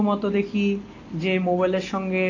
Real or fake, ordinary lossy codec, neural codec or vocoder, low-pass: real; AAC, 32 kbps; none; 7.2 kHz